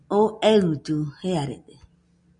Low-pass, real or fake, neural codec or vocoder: 9.9 kHz; real; none